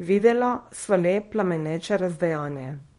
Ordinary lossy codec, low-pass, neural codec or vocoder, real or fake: MP3, 48 kbps; 10.8 kHz; codec, 24 kHz, 0.9 kbps, WavTokenizer, small release; fake